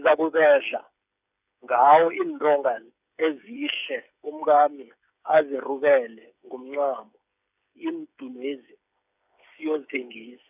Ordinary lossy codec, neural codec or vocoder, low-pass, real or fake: none; codec, 16 kHz, 8 kbps, FreqCodec, smaller model; 3.6 kHz; fake